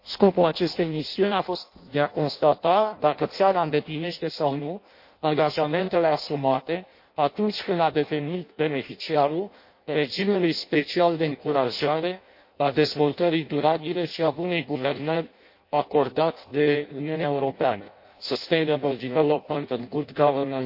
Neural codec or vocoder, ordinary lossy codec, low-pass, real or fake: codec, 16 kHz in and 24 kHz out, 0.6 kbps, FireRedTTS-2 codec; MP3, 32 kbps; 5.4 kHz; fake